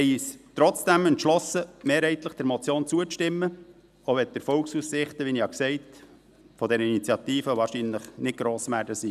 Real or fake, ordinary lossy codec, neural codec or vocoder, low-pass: real; none; none; 14.4 kHz